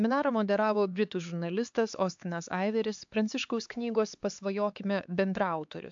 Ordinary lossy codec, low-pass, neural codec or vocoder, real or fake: AAC, 64 kbps; 7.2 kHz; codec, 16 kHz, 4 kbps, X-Codec, HuBERT features, trained on LibriSpeech; fake